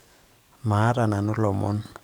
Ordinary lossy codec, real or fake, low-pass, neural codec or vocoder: none; fake; 19.8 kHz; codec, 44.1 kHz, 7.8 kbps, DAC